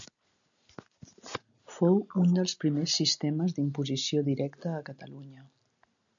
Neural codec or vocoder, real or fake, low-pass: none; real; 7.2 kHz